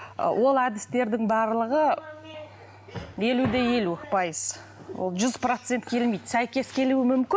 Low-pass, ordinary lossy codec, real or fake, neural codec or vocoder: none; none; real; none